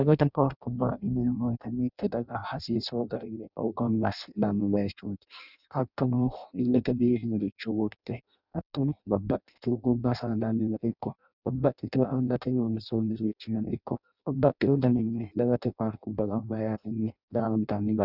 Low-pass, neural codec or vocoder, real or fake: 5.4 kHz; codec, 16 kHz in and 24 kHz out, 0.6 kbps, FireRedTTS-2 codec; fake